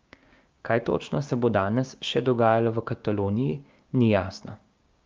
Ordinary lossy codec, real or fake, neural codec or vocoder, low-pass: Opus, 24 kbps; fake; codec, 16 kHz, 6 kbps, DAC; 7.2 kHz